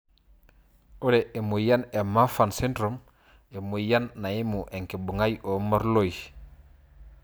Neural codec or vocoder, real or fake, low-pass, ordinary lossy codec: none; real; none; none